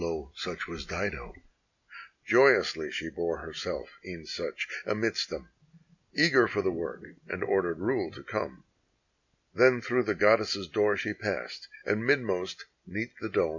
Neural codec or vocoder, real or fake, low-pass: none; real; 7.2 kHz